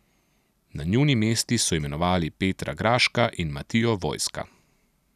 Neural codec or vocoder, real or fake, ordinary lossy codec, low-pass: none; real; none; 14.4 kHz